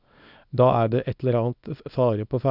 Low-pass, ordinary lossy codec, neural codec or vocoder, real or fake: 5.4 kHz; none; codec, 24 kHz, 0.9 kbps, WavTokenizer, small release; fake